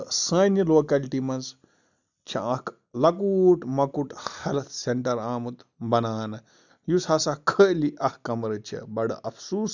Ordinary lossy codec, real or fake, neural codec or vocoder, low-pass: none; real; none; 7.2 kHz